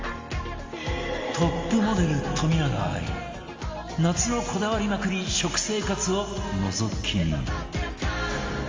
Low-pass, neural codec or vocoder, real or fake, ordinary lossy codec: 7.2 kHz; none; real; Opus, 32 kbps